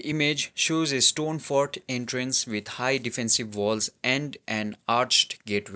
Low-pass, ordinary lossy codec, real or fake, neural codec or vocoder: none; none; real; none